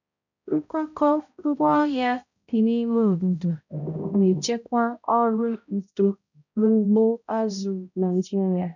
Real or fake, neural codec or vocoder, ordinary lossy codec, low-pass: fake; codec, 16 kHz, 0.5 kbps, X-Codec, HuBERT features, trained on balanced general audio; none; 7.2 kHz